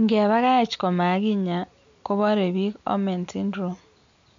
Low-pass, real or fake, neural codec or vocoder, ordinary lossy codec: 7.2 kHz; real; none; MP3, 64 kbps